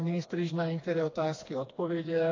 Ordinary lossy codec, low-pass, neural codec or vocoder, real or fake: AAC, 32 kbps; 7.2 kHz; codec, 16 kHz, 2 kbps, FreqCodec, smaller model; fake